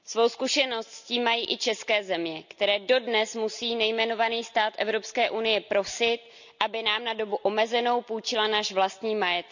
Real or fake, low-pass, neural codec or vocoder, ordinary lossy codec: real; 7.2 kHz; none; none